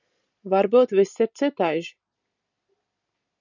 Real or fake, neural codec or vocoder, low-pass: real; none; 7.2 kHz